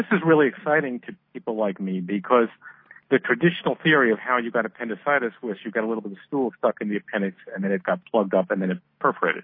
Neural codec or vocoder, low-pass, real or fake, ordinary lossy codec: none; 5.4 kHz; real; MP3, 24 kbps